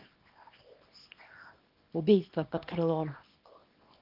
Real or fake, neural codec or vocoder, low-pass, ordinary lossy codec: fake; codec, 24 kHz, 0.9 kbps, WavTokenizer, small release; 5.4 kHz; Opus, 24 kbps